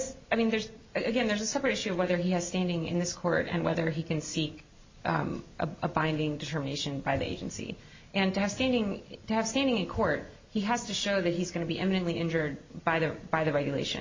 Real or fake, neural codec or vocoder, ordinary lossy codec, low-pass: real; none; MP3, 32 kbps; 7.2 kHz